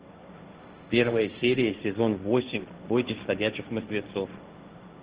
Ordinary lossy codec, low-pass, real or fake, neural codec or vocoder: Opus, 16 kbps; 3.6 kHz; fake; codec, 16 kHz, 1.1 kbps, Voila-Tokenizer